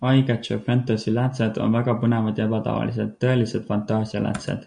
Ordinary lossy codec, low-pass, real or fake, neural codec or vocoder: MP3, 64 kbps; 9.9 kHz; real; none